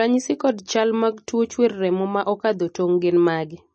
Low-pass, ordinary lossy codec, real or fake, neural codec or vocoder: 10.8 kHz; MP3, 32 kbps; real; none